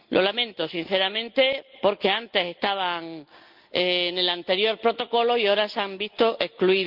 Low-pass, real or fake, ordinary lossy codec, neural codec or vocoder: 5.4 kHz; real; Opus, 24 kbps; none